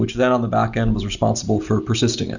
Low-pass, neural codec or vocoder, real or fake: 7.2 kHz; none; real